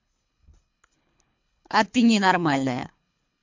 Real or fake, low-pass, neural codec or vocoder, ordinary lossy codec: fake; 7.2 kHz; codec, 24 kHz, 3 kbps, HILCodec; MP3, 48 kbps